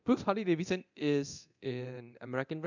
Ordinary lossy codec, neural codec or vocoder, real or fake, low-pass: none; codec, 24 kHz, 0.9 kbps, DualCodec; fake; 7.2 kHz